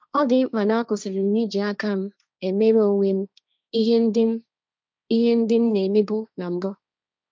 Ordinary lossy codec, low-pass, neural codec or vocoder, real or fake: none; none; codec, 16 kHz, 1.1 kbps, Voila-Tokenizer; fake